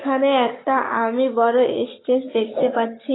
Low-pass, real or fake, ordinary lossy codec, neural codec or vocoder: 7.2 kHz; fake; AAC, 16 kbps; codec, 44.1 kHz, 7.8 kbps, Pupu-Codec